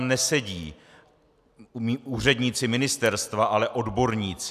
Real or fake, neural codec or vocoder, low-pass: real; none; 14.4 kHz